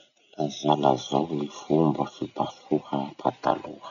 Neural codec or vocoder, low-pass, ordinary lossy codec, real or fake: none; 7.2 kHz; none; real